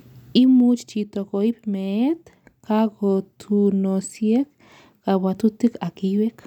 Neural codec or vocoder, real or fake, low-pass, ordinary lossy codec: none; real; 19.8 kHz; none